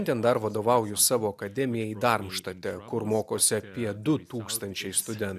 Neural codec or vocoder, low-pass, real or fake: none; 14.4 kHz; real